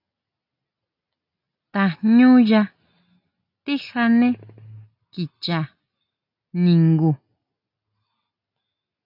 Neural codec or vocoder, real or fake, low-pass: none; real; 5.4 kHz